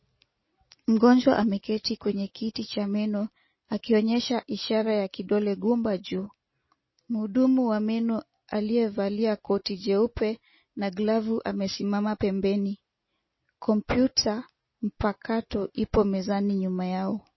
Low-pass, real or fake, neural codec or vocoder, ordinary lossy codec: 7.2 kHz; real; none; MP3, 24 kbps